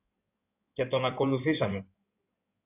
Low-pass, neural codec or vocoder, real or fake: 3.6 kHz; codec, 16 kHz in and 24 kHz out, 2.2 kbps, FireRedTTS-2 codec; fake